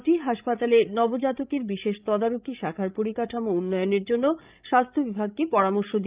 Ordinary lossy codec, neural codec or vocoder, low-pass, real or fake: Opus, 32 kbps; codec, 16 kHz, 16 kbps, FreqCodec, larger model; 3.6 kHz; fake